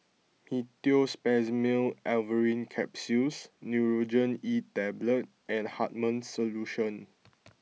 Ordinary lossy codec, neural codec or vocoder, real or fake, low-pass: none; none; real; none